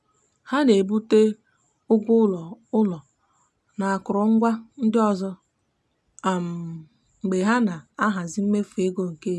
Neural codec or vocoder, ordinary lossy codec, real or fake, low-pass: none; none; real; none